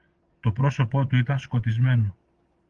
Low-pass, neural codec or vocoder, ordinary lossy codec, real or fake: 9.9 kHz; vocoder, 22.05 kHz, 80 mel bands, Vocos; Opus, 24 kbps; fake